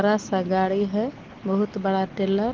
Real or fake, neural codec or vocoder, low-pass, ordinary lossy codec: real; none; 7.2 kHz; Opus, 16 kbps